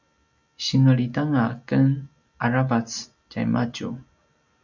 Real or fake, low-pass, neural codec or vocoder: real; 7.2 kHz; none